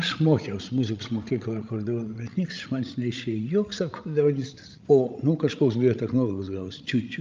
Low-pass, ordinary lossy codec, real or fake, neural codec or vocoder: 7.2 kHz; Opus, 24 kbps; fake; codec, 16 kHz, 16 kbps, FreqCodec, larger model